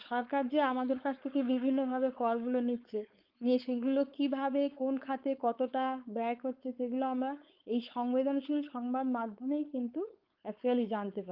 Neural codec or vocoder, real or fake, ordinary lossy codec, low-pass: codec, 16 kHz, 4.8 kbps, FACodec; fake; Opus, 32 kbps; 5.4 kHz